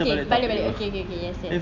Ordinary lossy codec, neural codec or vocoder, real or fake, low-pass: MP3, 64 kbps; none; real; 7.2 kHz